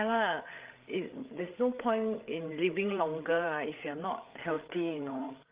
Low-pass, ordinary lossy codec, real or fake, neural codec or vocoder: 3.6 kHz; Opus, 24 kbps; fake; codec, 16 kHz, 8 kbps, FreqCodec, larger model